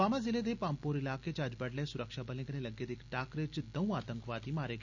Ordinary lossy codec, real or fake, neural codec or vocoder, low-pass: none; real; none; 7.2 kHz